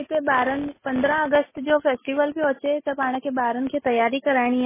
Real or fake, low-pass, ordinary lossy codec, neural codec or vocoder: real; 3.6 kHz; MP3, 16 kbps; none